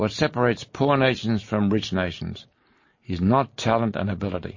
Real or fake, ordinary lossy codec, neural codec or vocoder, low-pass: real; MP3, 32 kbps; none; 7.2 kHz